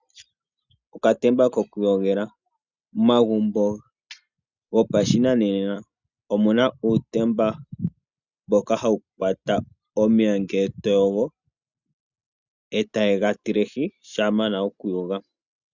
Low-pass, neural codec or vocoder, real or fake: 7.2 kHz; none; real